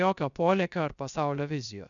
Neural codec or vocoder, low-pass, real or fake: codec, 16 kHz, 0.7 kbps, FocalCodec; 7.2 kHz; fake